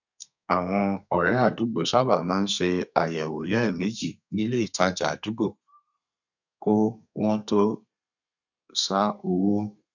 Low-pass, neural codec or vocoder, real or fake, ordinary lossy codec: 7.2 kHz; codec, 32 kHz, 1.9 kbps, SNAC; fake; none